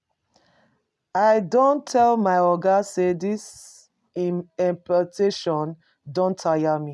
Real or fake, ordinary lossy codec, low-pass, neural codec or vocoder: real; none; none; none